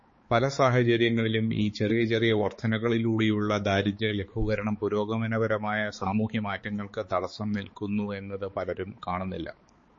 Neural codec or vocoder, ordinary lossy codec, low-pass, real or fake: codec, 16 kHz, 4 kbps, X-Codec, HuBERT features, trained on balanced general audio; MP3, 32 kbps; 7.2 kHz; fake